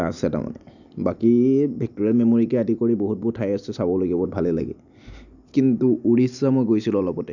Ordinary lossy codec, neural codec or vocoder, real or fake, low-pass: none; none; real; 7.2 kHz